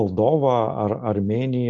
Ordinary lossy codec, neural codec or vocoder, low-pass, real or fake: Opus, 32 kbps; none; 7.2 kHz; real